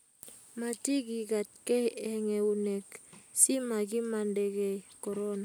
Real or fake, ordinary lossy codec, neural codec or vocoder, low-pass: real; none; none; none